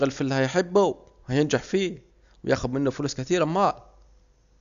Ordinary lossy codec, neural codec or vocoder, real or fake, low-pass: none; none; real; 7.2 kHz